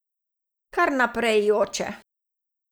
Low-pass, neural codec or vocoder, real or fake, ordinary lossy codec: none; none; real; none